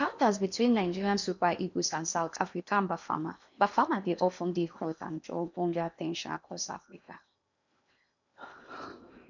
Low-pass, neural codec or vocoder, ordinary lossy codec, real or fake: 7.2 kHz; codec, 16 kHz in and 24 kHz out, 0.8 kbps, FocalCodec, streaming, 65536 codes; none; fake